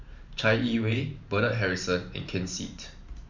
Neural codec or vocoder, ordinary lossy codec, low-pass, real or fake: vocoder, 44.1 kHz, 128 mel bands every 512 samples, BigVGAN v2; none; 7.2 kHz; fake